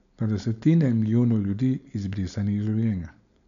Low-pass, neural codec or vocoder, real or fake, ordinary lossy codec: 7.2 kHz; codec, 16 kHz, 4.8 kbps, FACodec; fake; none